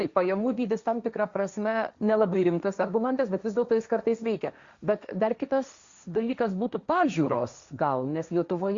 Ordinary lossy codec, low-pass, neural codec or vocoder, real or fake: Opus, 64 kbps; 7.2 kHz; codec, 16 kHz, 1.1 kbps, Voila-Tokenizer; fake